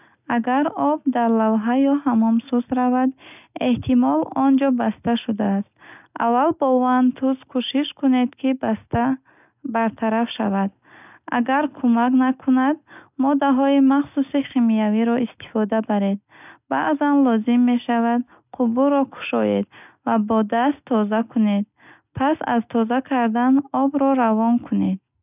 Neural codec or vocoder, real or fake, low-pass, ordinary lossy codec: none; real; 3.6 kHz; none